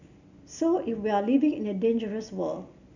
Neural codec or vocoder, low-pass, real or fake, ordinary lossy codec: none; 7.2 kHz; real; none